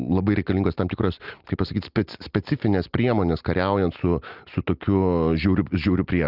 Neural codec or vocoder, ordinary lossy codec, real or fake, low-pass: none; Opus, 24 kbps; real; 5.4 kHz